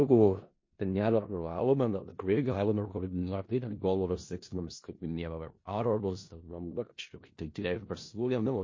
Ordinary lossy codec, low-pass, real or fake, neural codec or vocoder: MP3, 32 kbps; 7.2 kHz; fake; codec, 16 kHz in and 24 kHz out, 0.4 kbps, LongCat-Audio-Codec, four codebook decoder